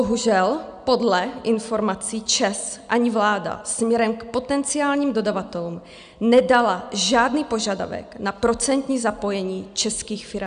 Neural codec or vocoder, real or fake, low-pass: none; real; 9.9 kHz